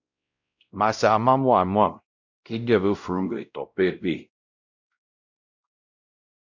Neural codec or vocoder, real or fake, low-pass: codec, 16 kHz, 0.5 kbps, X-Codec, WavLM features, trained on Multilingual LibriSpeech; fake; 7.2 kHz